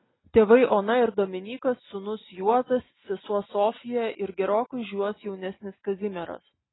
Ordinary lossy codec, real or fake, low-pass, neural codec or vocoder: AAC, 16 kbps; real; 7.2 kHz; none